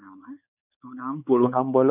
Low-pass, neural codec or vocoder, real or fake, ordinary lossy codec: 3.6 kHz; codec, 16 kHz, 4 kbps, X-Codec, WavLM features, trained on Multilingual LibriSpeech; fake; none